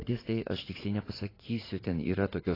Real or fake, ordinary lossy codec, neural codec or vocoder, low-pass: fake; AAC, 24 kbps; vocoder, 44.1 kHz, 80 mel bands, Vocos; 5.4 kHz